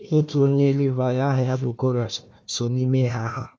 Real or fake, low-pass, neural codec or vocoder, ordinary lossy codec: fake; none; codec, 16 kHz, 1 kbps, FunCodec, trained on Chinese and English, 50 frames a second; none